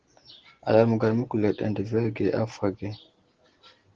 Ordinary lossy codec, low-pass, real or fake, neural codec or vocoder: Opus, 16 kbps; 7.2 kHz; real; none